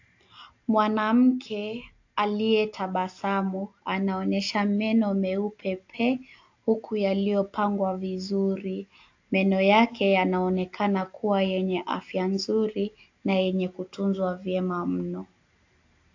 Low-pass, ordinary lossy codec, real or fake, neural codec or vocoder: 7.2 kHz; AAC, 48 kbps; real; none